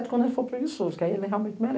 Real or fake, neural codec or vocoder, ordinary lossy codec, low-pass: real; none; none; none